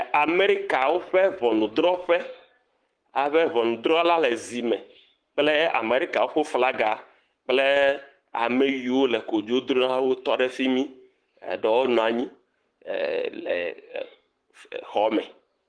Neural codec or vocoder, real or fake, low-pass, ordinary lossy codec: codec, 44.1 kHz, 7.8 kbps, Pupu-Codec; fake; 9.9 kHz; Opus, 24 kbps